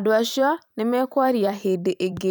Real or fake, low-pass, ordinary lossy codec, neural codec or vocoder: fake; none; none; vocoder, 44.1 kHz, 128 mel bands every 512 samples, BigVGAN v2